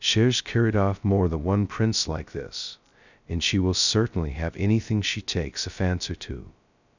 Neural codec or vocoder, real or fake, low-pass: codec, 16 kHz, 0.2 kbps, FocalCodec; fake; 7.2 kHz